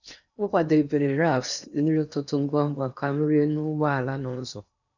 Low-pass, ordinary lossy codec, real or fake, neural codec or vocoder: 7.2 kHz; none; fake; codec, 16 kHz in and 24 kHz out, 0.8 kbps, FocalCodec, streaming, 65536 codes